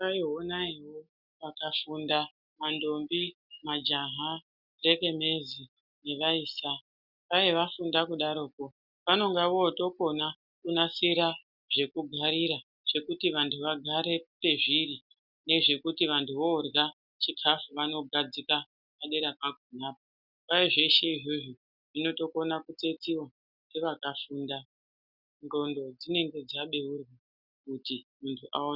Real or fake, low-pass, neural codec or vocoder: real; 5.4 kHz; none